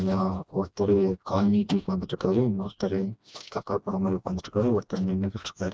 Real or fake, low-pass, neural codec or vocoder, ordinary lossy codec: fake; none; codec, 16 kHz, 1 kbps, FreqCodec, smaller model; none